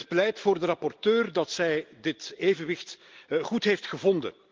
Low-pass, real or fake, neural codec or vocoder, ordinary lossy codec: 7.2 kHz; real; none; Opus, 32 kbps